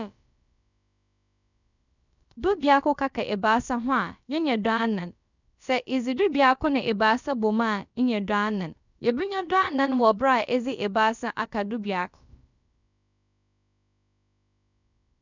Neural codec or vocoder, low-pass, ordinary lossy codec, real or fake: codec, 16 kHz, about 1 kbps, DyCAST, with the encoder's durations; 7.2 kHz; none; fake